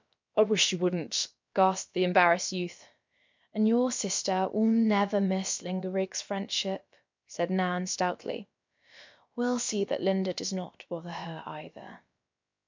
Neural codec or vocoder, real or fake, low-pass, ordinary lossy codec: codec, 16 kHz, about 1 kbps, DyCAST, with the encoder's durations; fake; 7.2 kHz; MP3, 64 kbps